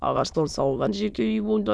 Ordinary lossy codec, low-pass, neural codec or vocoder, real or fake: none; none; autoencoder, 22.05 kHz, a latent of 192 numbers a frame, VITS, trained on many speakers; fake